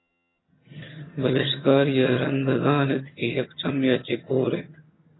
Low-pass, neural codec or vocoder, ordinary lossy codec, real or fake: 7.2 kHz; vocoder, 22.05 kHz, 80 mel bands, HiFi-GAN; AAC, 16 kbps; fake